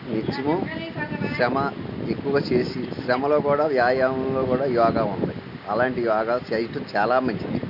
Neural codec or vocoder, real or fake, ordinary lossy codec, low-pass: none; real; none; 5.4 kHz